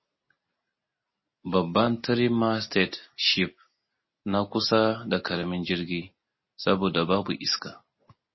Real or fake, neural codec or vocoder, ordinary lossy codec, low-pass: real; none; MP3, 24 kbps; 7.2 kHz